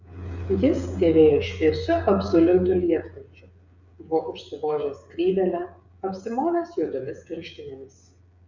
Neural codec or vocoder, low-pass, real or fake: codec, 16 kHz, 8 kbps, FreqCodec, smaller model; 7.2 kHz; fake